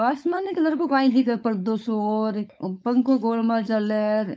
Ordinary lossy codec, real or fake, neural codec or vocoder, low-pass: none; fake; codec, 16 kHz, 4.8 kbps, FACodec; none